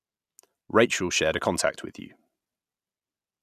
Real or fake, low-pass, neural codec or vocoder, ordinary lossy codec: real; 14.4 kHz; none; none